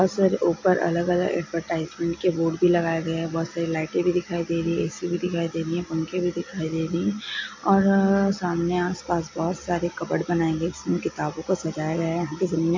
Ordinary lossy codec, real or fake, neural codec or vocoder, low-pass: AAC, 48 kbps; real; none; 7.2 kHz